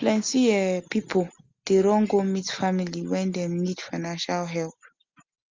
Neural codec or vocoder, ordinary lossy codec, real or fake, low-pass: none; Opus, 32 kbps; real; 7.2 kHz